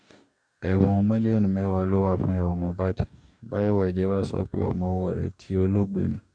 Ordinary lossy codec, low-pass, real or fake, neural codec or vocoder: none; 9.9 kHz; fake; codec, 44.1 kHz, 2.6 kbps, DAC